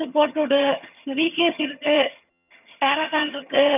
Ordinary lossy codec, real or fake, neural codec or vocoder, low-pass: none; fake; vocoder, 22.05 kHz, 80 mel bands, HiFi-GAN; 3.6 kHz